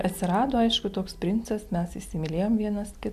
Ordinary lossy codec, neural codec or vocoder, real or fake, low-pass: MP3, 96 kbps; none; real; 14.4 kHz